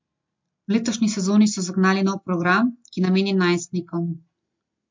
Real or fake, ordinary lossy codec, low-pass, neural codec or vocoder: real; MP3, 48 kbps; 7.2 kHz; none